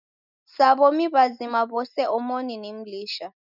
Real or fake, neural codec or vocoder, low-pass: real; none; 5.4 kHz